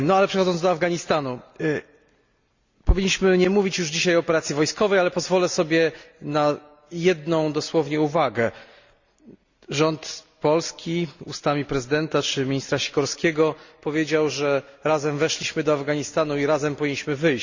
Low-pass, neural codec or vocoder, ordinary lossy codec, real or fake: 7.2 kHz; none; Opus, 64 kbps; real